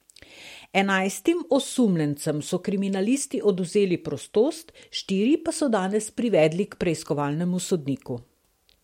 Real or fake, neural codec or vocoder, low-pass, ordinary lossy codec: real; none; 19.8 kHz; MP3, 64 kbps